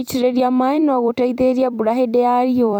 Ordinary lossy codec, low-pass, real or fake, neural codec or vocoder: none; 19.8 kHz; fake; vocoder, 44.1 kHz, 128 mel bands every 256 samples, BigVGAN v2